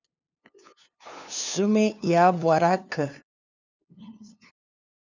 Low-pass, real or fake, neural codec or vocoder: 7.2 kHz; fake; codec, 16 kHz, 2 kbps, FunCodec, trained on LibriTTS, 25 frames a second